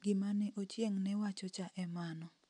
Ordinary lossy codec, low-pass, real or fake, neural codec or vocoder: MP3, 96 kbps; 9.9 kHz; real; none